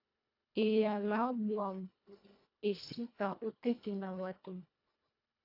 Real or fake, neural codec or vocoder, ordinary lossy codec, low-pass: fake; codec, 24 kHz, 1.5 kbps, HILCodec; AAC, 48 kbps; 5.4 kHz